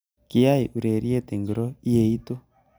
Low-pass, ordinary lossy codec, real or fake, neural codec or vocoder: none; none; real; none